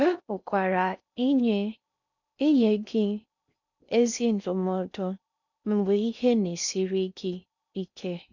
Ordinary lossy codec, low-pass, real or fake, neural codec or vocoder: none; 7.2 kHz; fake; codec, 16 kHz in and 24 kHz out, 0.6 kbps, FocalCodec, streaming, 4096 codes